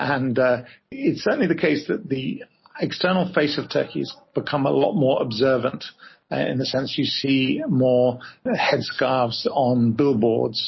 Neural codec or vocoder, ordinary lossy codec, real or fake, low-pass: none; MP3, 24 kbps; real; 7.2 kHz